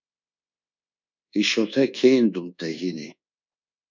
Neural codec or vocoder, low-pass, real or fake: codec, 24 kHz, 1.2 kbps, DualCodec; 7.2 kHz; fake